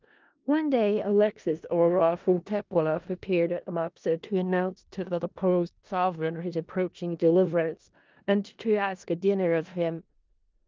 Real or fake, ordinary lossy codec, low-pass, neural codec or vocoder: fake; Opus, 24 kbps; 7.2 kHz; codec, 16 kHz in and 24 kHz out, 0.4 kbps, LongCat-Audio-Codec, four codebook decoder